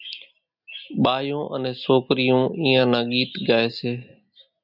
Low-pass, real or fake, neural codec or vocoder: 5.4 kHz; real; none